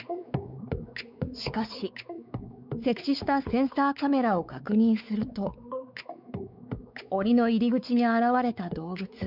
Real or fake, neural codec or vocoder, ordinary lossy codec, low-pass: fake; codec, 16 kHz, 4 kbps, X-Codec, WavLM features, trained on Multilingual LibriSpeech; none; 5.4 kHz